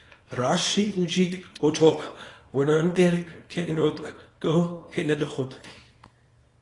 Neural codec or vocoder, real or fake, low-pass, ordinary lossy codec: codec, 24 kHz, 0.9 kbps, WavTokenizer, small release; fake; 10.8 kHz; AAC, 32 kbps